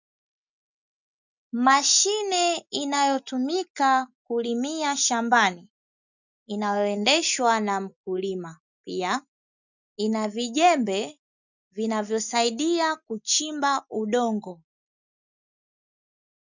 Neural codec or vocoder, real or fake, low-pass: none; real; 7.2 kHz